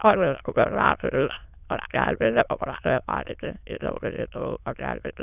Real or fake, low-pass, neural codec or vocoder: fake; 3.6 kHz; autoencoder, 22.05 kHz, a latent of 192 numbers a frame, VITS, trained on many speakers